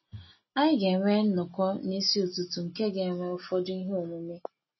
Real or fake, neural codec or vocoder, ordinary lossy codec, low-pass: real; none; MP3, 24 kbps; 7.2 kHz